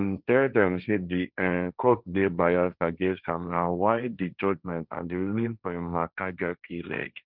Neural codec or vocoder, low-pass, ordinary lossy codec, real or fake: codec, 16 kHz, 1.1 kbps, Voila-Tokenizer; 5.4 kHz; none; fake